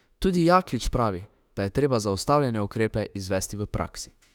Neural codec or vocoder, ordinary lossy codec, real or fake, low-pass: autoencoder, 48 kHz, 32 numbers a frame, DAC-VAE, trained on Japanese speech; none; fake; 19.8 kHz